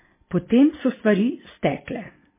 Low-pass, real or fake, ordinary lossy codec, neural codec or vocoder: 3.6 kHz; real; MP3, 16 kbps; none